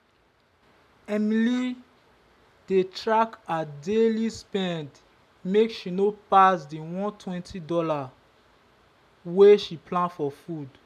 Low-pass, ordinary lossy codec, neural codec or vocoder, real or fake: 14.4 kHz; none; none; real